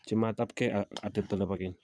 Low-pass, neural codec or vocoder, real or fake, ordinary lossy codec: none; vocoder, 22.05 kHz, 80 mel bands, Vocos; fake; none